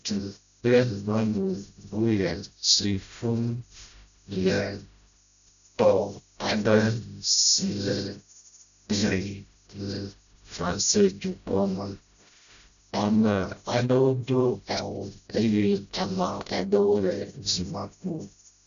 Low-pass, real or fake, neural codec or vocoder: 7.2 kHz; fake; codec, 16 kHz, 0.5 kbps, FreqCodec, smaller model